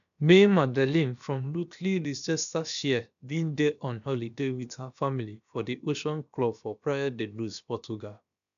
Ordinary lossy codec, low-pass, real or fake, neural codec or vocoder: none; 7.2 kHz; fake; codec, 16 kHz, about 1 kbps, DyCAST, with the encoder's durations